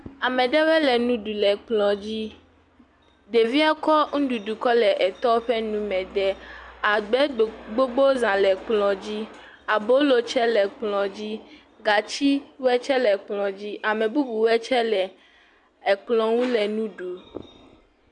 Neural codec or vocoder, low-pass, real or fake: none; 10.8 kHz; real